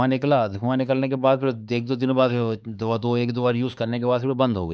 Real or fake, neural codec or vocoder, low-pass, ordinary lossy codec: fake; codec, 16 kHz, 4 kbps, X-Codec, HuBERT features, trained on LibriSpeech; none; none